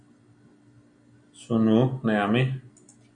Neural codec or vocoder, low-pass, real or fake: none; 9.9 kHz; real